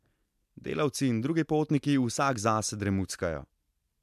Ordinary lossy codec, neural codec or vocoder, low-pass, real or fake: MP3, 96 kbps; none; 14.4 kHz; real